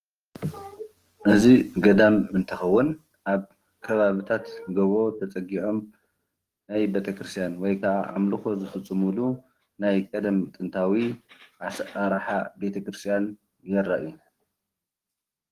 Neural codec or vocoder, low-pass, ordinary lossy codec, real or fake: codec, 44.1 kHz, 7.8 kbps, DAC; 14.4 kHz; Opus, 24 kbps; fake